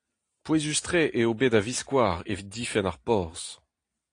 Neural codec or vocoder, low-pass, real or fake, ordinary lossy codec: none; 9.9 kHz; real; AAC, 48 kbps